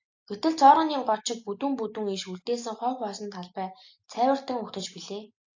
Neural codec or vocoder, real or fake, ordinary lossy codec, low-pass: none; real; AAC, 48 kbps; 7.2 kHz